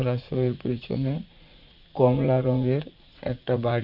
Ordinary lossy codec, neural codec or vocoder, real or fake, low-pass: none; vocoder, 44.1 kHz, 80 mel bands, Vocos; fake; 5.4 kHz